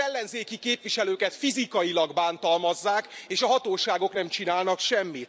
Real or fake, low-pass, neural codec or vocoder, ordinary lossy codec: real; none; none; none